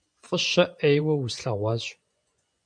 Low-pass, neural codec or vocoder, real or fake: 9.9 kHz; vocoder, 24 kHz, 100 mel bands, Vocos; fake